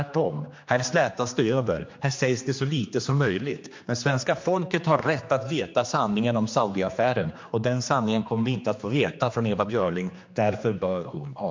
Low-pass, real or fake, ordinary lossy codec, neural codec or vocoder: 7.2 kHz; fake; MP3, 48 kbps; codec, 16 kHz, 2 kbps, X-Codec, HuBERT features, trained on general audio